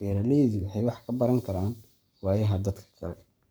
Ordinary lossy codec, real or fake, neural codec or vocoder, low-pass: none; fake; codec, 44.1 kHz, 7.8 kbps, Pupu-Codec; none